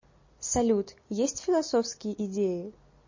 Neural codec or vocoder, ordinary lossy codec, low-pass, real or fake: none; MP3, 32 kbps; 7.2 kHz; real